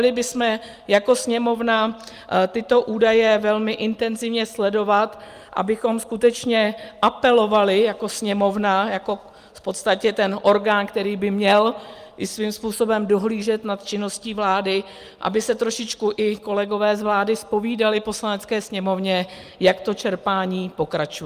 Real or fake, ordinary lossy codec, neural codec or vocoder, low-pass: real; Opus, 32 kbps; none; 14.4 kHz